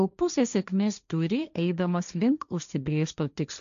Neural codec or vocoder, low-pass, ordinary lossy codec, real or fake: codec, 16 kHz, 1.1 kbps, Voila-Tokenizer; 7.2 kHz; MP3, 96 kbps; fake